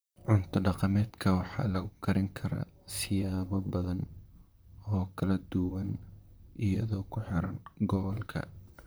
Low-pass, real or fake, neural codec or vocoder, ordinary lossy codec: none; fake; vocoder, 44.1 kHz, 128 mel bands, Pupu-Vocoder; none